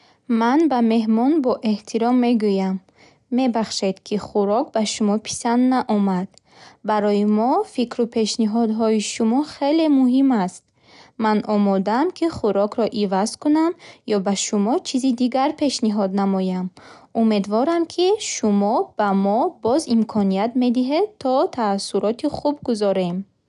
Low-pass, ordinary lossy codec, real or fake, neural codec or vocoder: 10.8 kHz; none; real; none